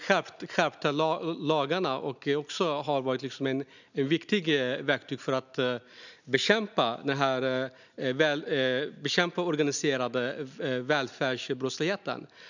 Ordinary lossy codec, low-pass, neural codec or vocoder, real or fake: none; 7.2 kHz; none; real